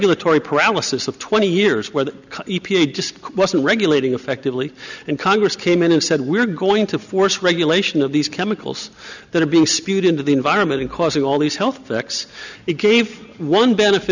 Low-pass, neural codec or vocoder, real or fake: 7.2 kHz; none; real